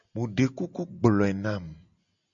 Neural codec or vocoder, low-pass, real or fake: none; 7.2 kHz; real